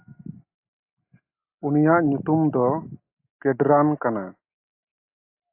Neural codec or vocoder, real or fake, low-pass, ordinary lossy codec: none; real; 3.6 kHz; AAC, 24 kbps